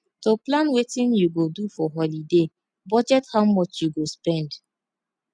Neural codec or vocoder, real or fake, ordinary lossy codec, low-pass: none; real; none; 9.9 kHz